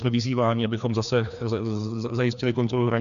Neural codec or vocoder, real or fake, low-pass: codec, 16 kHz, 2 kbps, FreqCodec, larger model; fake; 7.2 kHz